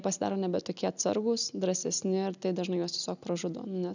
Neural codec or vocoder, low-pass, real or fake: none; 7.2 kHz; real